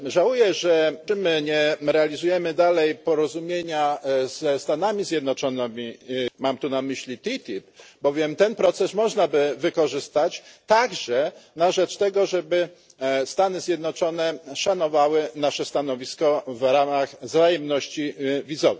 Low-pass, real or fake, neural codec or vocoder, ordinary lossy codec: none; real; none; none